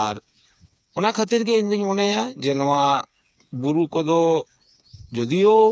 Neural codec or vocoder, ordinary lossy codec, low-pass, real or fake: codec, 16 kHz, 4 kbps, FreqCodec, smaller model; none; none; fake